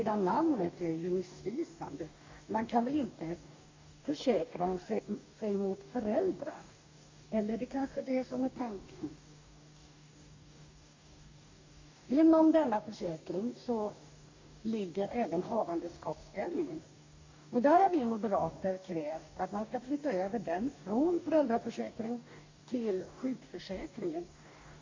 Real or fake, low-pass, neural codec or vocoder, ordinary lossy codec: fake; 7.2 kHz; codec, 44.1 kHz, 2.6 kbps, DAC; MP3, 64 kbps